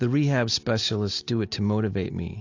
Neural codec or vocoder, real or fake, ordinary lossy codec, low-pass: none; real; AAC, 48 kbps; 7.2 kHz